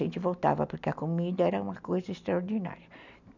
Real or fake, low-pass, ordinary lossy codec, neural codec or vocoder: real; 7.2 kHz; none; none